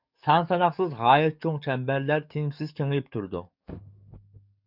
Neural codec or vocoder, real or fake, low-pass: codec, 44.1 kHz, 7.8 kbps, DAC; fake; 5.4 kHz